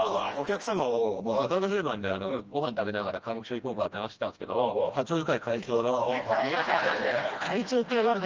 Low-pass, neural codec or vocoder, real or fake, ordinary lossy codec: 7.2 kHz; codec, 16 kHz, 1 kbps, FreqCodec, smaller model; fake; Opus, 24 kbps